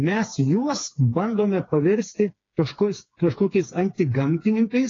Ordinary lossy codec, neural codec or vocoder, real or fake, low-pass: AAC, 32 kbps; codec, 16 kHz, 4 kbps, FreqCodec, smaller model; fake; 7.2 kHz